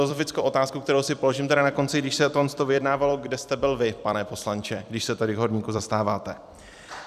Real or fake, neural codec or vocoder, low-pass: fake; vocoder, 44.1 kHz, 128 mel bands every 256 samples, BigVGAN v2; 14.4 kHz